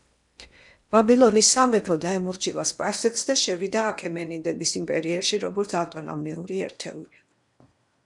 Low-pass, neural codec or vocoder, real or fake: 10.8 kHz; codec, 16 kHz in and 24 kHz out, 0.8 kbps, FocalCodec, streaming, 65536 codes; fake